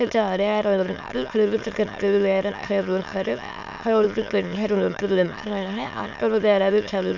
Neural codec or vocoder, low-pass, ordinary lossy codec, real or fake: autoencoder, 22.05 kHz, a latent of 192 numbers a frame, VITS, trained on many speakers; 7.2 kHz; none; fake